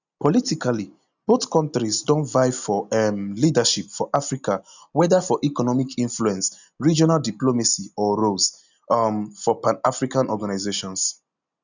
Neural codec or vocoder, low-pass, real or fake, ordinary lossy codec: none; 7.2 kHz; real; none